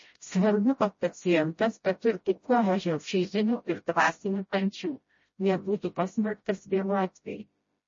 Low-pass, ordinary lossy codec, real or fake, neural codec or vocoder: 7.2 kHz; MP3, 32 kbps; fake; codec, 16 kHz, 0.5 kbps, FreqCodec, smaller model